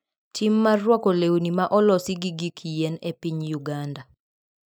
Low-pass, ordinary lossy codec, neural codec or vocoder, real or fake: none; none; none; real